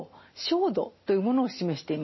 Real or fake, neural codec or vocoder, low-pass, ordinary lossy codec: real; none; 7.2 kHz; MP3, 24 kbps